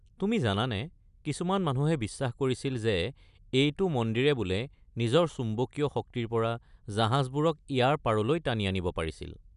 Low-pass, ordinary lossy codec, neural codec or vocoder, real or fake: 10.8 kHz; none; none; real